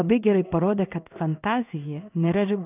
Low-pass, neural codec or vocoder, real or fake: 3.6 kHz; codec, 16 kHz in and 24 kHz out, 1 kbps, XY-Tokenizer; fake